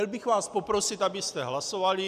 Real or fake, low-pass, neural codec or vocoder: real; 14.4 kHz; none